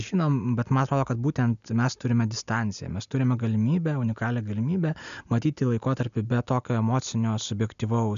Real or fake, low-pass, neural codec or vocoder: real; 7.2 kHz; none